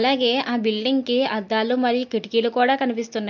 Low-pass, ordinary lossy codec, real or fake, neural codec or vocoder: 7.2 kHz; MP3, 48 kbps; real; none